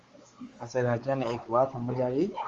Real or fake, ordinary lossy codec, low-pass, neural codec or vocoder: fake; Opus, 32 kbps; 7.2 kHz; codec, 16 kHz, 4 kbps, X-Codec, WavLM features, trained on Multilingual LibriSpeech